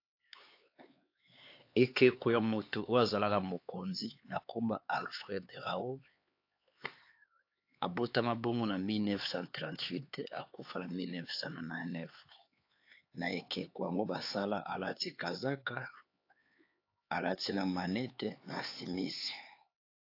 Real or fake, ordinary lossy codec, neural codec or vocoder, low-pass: fake; AAC, 32 kbps; codec, 16 kHz, 4 kbps, X-Codec, HuBERT features, trained on LibriSpeech; 5.4 kHz